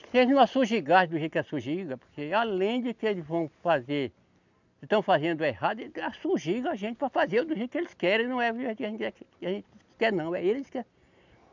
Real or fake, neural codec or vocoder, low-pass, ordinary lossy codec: real; none; 7.2 kHz; none